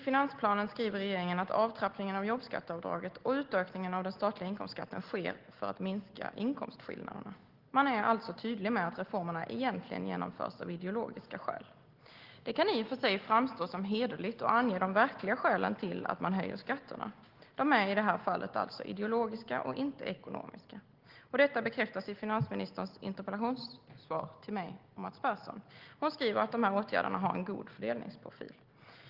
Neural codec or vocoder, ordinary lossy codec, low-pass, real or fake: none; Opus, 16 kbps; 5.4 kHz; real